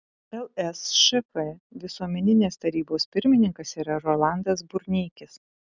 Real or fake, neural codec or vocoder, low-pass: real; none; 7.2 kHz